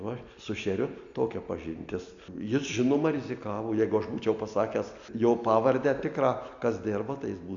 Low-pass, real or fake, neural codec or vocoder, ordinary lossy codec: 7.2 kHz; real; none; AAC, 64 kbps